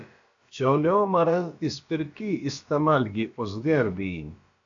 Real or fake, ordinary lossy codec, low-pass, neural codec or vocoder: fake; AAC, 64 kbps; 7.2 kHz; codec, 16 kHz, about 1 kbps, DyCAST, with the encoder's durations